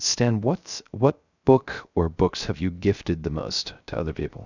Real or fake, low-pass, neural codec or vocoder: fake; 7.2 kHz; codec, 16 kHz, 0.3 kbps, FocalCodec